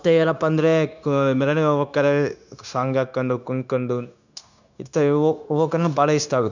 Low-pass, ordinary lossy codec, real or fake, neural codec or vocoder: 7.2 kHz; none; fake; codec, 16 kHz, 0.9 kbps, LongCat-Audio-Codec